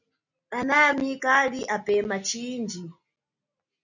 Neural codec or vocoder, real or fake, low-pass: none; real; 7.2 kHz